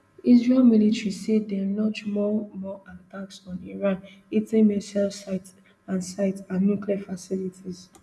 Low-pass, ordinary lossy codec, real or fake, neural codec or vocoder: none; none; real; none